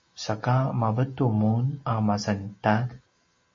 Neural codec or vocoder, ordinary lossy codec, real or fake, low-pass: none; MP3, 32 kbps; real; 7.2 kHz